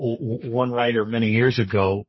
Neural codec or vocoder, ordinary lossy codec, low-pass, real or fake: codec, 32 kHz, 1.9 kbps, SNAC; MP3, 24 kbps; 7.2 kHz; fake